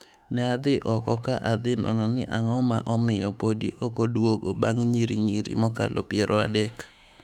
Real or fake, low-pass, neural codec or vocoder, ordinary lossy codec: fake; 19.8 kHz; autoencoder, 48 kHz, 32 numbers a frame, DAC-VAE, trained on Japanese speech; none